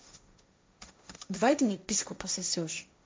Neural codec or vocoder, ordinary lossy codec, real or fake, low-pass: codec, 16 kHz, 1.1 kbps, Voila-Tokenizer; none; fake; none